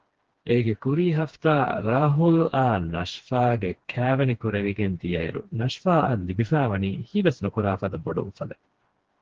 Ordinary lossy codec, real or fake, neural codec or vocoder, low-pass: Opus, 16 kbps; fake; codec, 16 kHz, 2 kbps, FreqCodec, smaller model; 7.2 kHz